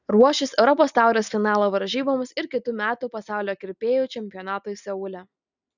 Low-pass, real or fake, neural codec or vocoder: 7.2 kHz; real; none